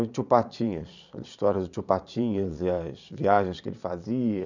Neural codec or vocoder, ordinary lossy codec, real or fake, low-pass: vocoder, 22.05 kHz, 80 mel bands, Vocos; none; fake; 7.2 kHz